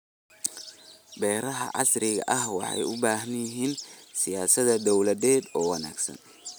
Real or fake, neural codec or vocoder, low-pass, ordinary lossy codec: real; none; none; none